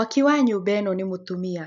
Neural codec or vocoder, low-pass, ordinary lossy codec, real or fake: none; 7.2 kHz; none; real